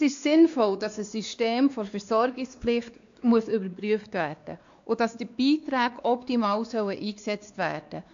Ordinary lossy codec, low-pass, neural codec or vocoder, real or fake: AAC, 64 kbps; 7.2 kHz; codec, 16 kHz, 2 kbps, X-Codec, WavLM features, trained on Multilingual LibriSpeech; fake